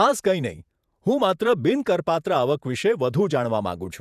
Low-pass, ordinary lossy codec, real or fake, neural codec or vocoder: 14.4 kHz; none; fake; vocoder, 48 kHz, 128 mel bands, Vocos